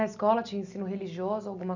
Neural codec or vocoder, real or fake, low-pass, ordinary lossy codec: none; real; 7.2 kHz; none